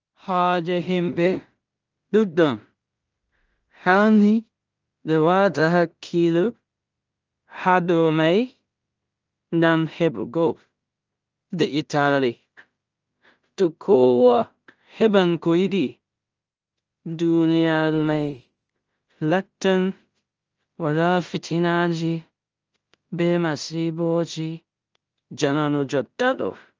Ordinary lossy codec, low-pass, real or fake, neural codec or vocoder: Opus, 24 kbps; 7.2 kHz; fake; codec, 16 kHz in and 24 kHz out, 0.4 kbps, LongCat-Audio-Codec, two codebook decoder